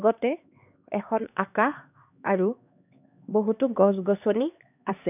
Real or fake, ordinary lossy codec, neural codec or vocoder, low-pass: fake; none; codec, 16 kHz, 2 kbps, X-Codec, HuBERT features, trained on LibriSpeech; 3.6 kHz